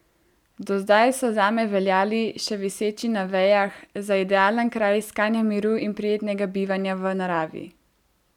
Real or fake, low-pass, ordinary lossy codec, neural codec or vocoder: real; 19.8 kHz; none; none